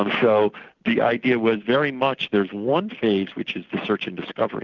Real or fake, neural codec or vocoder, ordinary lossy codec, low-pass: real; none; Opus, 64 kbps; 7.2 kHz